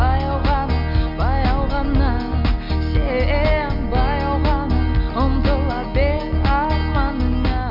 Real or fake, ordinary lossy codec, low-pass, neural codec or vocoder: real; none; 5.4 kHz; none